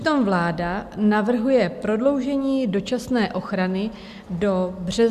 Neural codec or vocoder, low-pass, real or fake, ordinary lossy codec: none; 14.4 kHz; real; Opus, 64 kbps